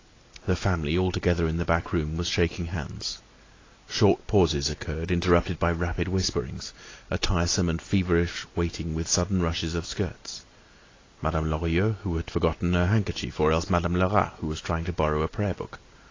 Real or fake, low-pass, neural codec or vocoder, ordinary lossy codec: real; 7.2 kHz; none; AAC, 32 kbps